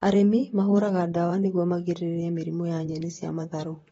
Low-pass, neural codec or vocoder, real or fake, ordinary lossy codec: 19.8 kHz; vocoder, 44.1 kHz, 128 mel bands, Pupu-Vocoder; fake; AAC, 24 kbps